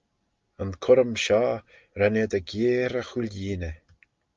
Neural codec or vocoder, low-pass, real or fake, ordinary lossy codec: none; 7.2 kHz; real; Opus, 32 kbps